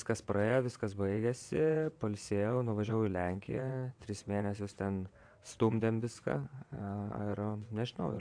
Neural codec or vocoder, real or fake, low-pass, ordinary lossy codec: vocoder, 22.05 kHz, 80 mel bands, WaveNeXt; fake; 9.9 kHz; AAC, 64 kbps